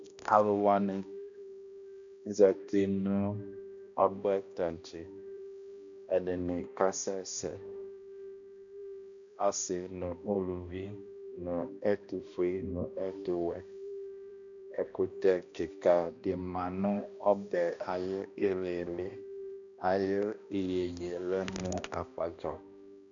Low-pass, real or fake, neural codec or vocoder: 7.2 kHz; fake; codec, 16 kHz, 1 kbps, X-Codec, HuBERT features, trained on balanced general audio